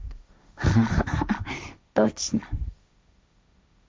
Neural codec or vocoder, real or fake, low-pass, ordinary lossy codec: codec, 16 kHz, 1.1 kbps, Voila-Tokenizer; fake; none; none